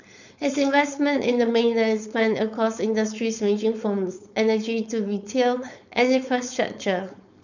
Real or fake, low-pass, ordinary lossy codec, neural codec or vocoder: fake; 7.2 kHz; none; codec, 16 kHz, 4.8 kbps, FACodec